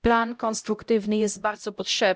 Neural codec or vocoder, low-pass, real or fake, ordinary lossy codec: codec, 16 kHz, 0.5 kbps, X-Codec, HuBERT features, trained on LibriSpeech; none; fake; none